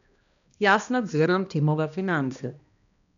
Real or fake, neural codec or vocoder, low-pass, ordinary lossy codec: fake; codec, 16 kHz, 1 kbps, X-Codec, HuBERT features, trained on balanced general audio; 7.2 kHz; none